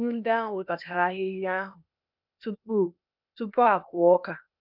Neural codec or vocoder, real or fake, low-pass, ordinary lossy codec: codec, 16 kHz, 0.8 kbps, ZipCodec; fake; 5.4 kHz; none